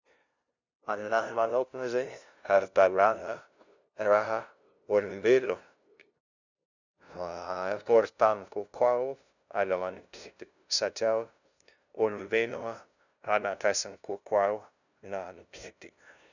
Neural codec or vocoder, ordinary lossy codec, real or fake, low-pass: codec, 16 kHz, 0.5 kbps, FunCodec, trained on LibriTTS, 25 frames a second; none; fake; 7.2 kHz